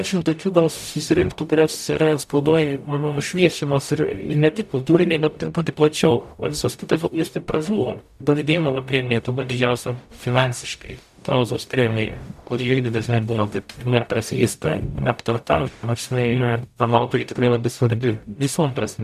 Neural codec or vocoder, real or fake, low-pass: codec, 44.1 kHz, 0.9 kbps, DAC; fake; 14.4 kHz